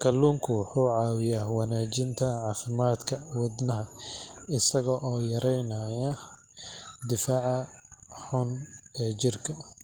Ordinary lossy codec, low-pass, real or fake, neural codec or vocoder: Opus, 24 kbps; 19.8 kHz; real; none